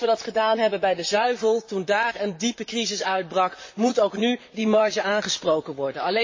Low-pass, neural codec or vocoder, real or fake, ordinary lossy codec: 7.2 kHz; vocoder, 44.1 kHz, 128 mel bands, Pupu-Vocoder; fake; MP3, 32 kbps